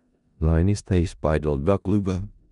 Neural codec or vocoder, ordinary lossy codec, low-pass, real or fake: codec, 16 kHz in and 24 kHz out, 0.4 kbps, LongCat-Audio-Codec, four codebook decoder; none; 10.8 kHz; fake